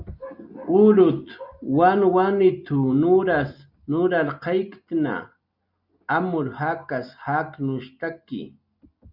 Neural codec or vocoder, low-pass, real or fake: none; 5.4 kHz; real